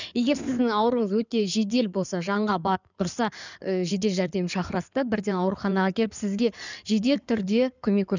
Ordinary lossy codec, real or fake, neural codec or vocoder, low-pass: none; fake; codec, 16 kHz in and 24 kHz out, 2.2 kbps, FireRedTTS-2 codec; 7.2 kHz